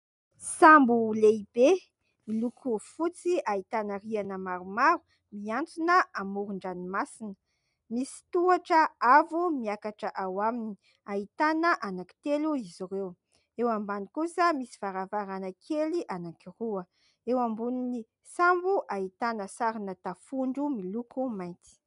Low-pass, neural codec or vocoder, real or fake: 10.8 kHz; none; real